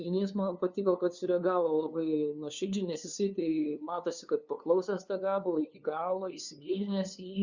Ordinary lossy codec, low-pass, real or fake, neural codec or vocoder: Opus, 64 kbps; 7.2 kHz; fake; codec, 16 kHz, 2 kbps, FunCodec, trained on LibriTTS, 25 frames a second